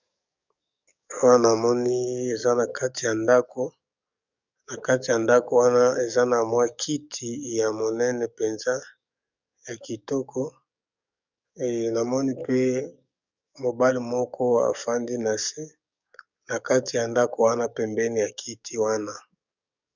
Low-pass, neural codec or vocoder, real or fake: 7.2 kHz; codec, 44.1 kHz, 7.8 kbps, DAC; fake